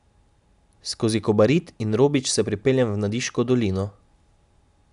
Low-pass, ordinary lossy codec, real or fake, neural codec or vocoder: 10.8 kHz; MP3, 96 kbps; real; none